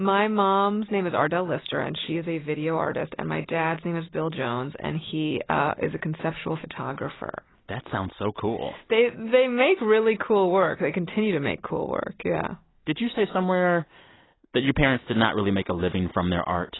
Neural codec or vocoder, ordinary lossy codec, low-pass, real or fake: none; AAC, 16 kbps; 7.2 kHz; real